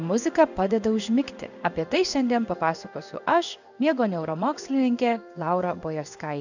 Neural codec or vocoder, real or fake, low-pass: codec, 16 kHz in and 24 kHz out, 1 kbps, XY-Tokenizer; fake; 7.2 kHz